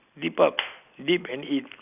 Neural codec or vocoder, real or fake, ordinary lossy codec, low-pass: none; real; none; 3.6 kHz